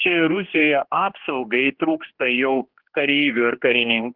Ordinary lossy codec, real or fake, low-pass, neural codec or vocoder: Opus, 16 kbps; fake; 5.4 kHz; codec, 16 kHz, 2 kbps, X-Codec, HuBERT features, trained on general audio